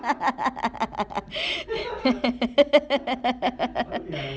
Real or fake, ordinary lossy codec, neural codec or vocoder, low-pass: real; none; none; none